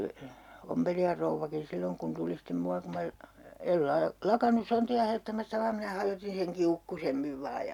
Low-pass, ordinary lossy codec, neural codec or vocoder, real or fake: 19.8 kHz; none; none; real